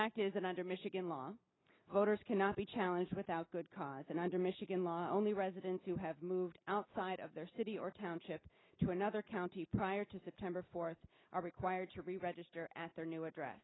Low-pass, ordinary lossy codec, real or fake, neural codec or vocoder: 7.2 kHz; AAC, 16 kbps; real; none